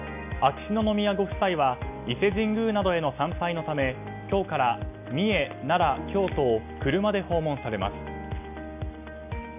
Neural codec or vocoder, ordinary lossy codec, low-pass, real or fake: none; none; 3.6 kHz; real